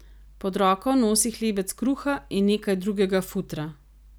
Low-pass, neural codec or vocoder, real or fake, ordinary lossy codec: none; none; real; none